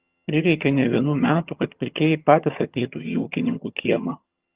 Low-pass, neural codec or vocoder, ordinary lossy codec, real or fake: 3.6 kHz; vocoder, 22.05 kHz, 80 mel bands, HiFi-GAN; Opus, 32 kbps; fake